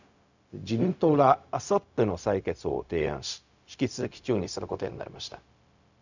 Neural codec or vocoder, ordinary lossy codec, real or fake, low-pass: codec, 16 kHz, 0.4 kbps, LongCat-Audio-Codec; none; fake; 7.2 kHz